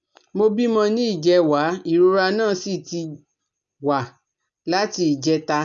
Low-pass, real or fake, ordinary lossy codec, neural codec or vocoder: 7.2 kHz; real; none; none